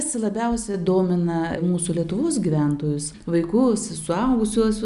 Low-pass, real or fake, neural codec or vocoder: 10.8 kHz; real; none